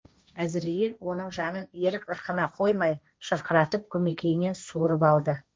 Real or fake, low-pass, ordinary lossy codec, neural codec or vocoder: fake; none; none; codec, 16 kHz, 1.1 kbps, Voila-Tokenizer